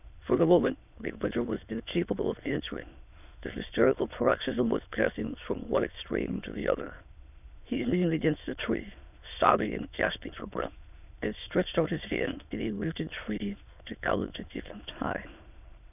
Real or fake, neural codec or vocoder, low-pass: fake; autoencoder, 22.05 kHz, a latent of 192 numbers a frame, VITS, trained on many speakers; 3.6 kHz